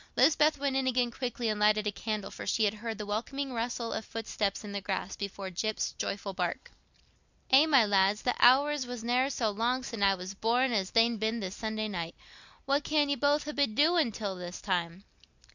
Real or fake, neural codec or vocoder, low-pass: real; none; 7.2 kHz